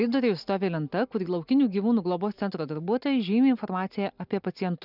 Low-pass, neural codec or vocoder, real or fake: 5.4 kHz; none; real